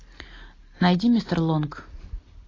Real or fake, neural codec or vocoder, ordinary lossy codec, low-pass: real; none; AAC, 32 kbps; 7.2 kHz